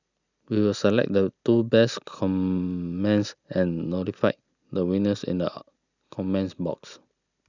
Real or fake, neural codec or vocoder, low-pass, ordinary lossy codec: real; none; 7.2 kHz; none